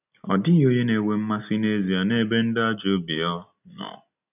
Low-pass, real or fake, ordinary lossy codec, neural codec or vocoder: 3.6 kHz; real; none; none